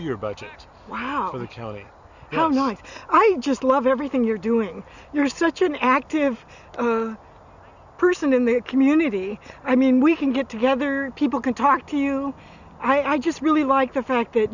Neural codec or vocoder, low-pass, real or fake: none; 7.2 kHz; real